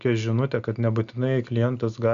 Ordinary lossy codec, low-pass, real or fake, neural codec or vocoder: Opus, 64 kbps; 7.2 kHz; real; none